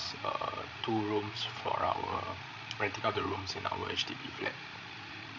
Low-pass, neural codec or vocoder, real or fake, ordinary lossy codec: 7.2 kHz; codec, 16 kHz, 16 kbps, FreqCodec, larger model; fake; none